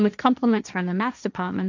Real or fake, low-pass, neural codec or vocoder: fake; 7.2 kHz; codec, 16 kHz, 1.1 kbps, Voila-Tokenizer